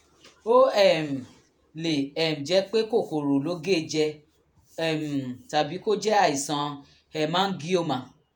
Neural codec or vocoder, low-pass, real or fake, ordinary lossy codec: vocoder, 48 kHz, 128 mel bands, Vocos; none; fake; none